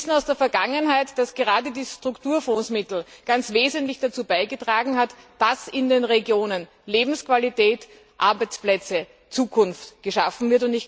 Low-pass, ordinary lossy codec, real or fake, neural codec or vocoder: none; none; real; none